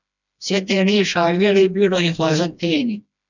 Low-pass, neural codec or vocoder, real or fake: 7.2 kHz; codec, 16 kHz, 1 kbps, FreqCodec, smaller model; fake